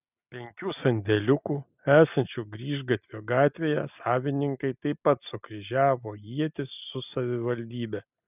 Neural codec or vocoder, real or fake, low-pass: none; real; 3.6 kHz